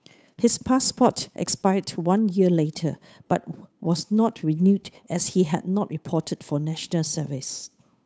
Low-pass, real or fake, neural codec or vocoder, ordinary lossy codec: none; fake; codec, 16 kHz, 8 kbps, FunCodec, trained on Chinese and English, 25 frames a second; none